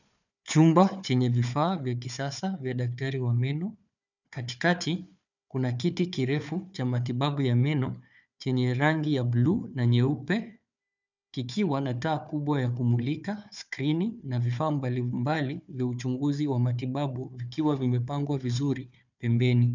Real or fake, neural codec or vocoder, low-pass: fake; codec, 16 kHz, 4 kbps, FunCodec, trained on Chinese and English, 50 frames a second; 7.2 kHz